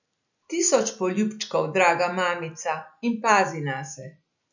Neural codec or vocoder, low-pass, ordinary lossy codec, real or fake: none; 7.2 kHz; none; real